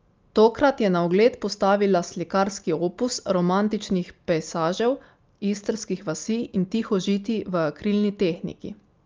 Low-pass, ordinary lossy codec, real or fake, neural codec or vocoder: 7.2 kHz; Opus, 24 kbps; real; none